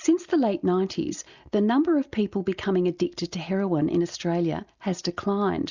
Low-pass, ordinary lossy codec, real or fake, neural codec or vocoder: 7.2 kHz; Opus, 64 kbps; real; none